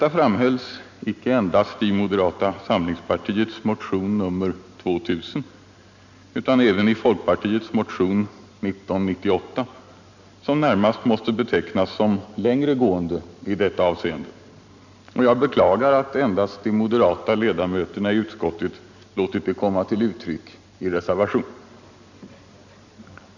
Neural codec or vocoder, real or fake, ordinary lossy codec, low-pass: none; real; none; 7.2 kHz